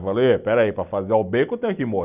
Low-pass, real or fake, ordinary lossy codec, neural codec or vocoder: 3.6 kHz; real; none; none